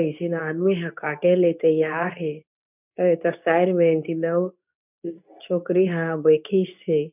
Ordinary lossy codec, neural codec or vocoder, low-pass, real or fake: none; codec, 24 kHz, 0.9 kbps, WavTokenizer, medium speech release version 2; 3.6 kHz; fake